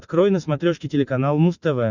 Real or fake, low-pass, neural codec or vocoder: real; 7.2 kHz; none